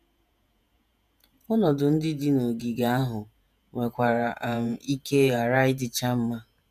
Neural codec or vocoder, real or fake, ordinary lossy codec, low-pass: vocoder, 48 kHz, 128 mel bands, Vocos; fake; none; 14.4 kHz